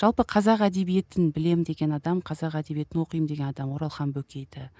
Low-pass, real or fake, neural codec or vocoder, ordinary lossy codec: none; real; none; none